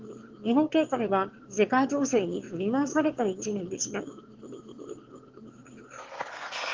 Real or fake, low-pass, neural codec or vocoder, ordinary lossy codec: fake; 7.2 kHz; autoencoder, 22.05 kHz, a latent of 192 numbers a frame, VITS, trained on one speaker; Opus, 16 kbps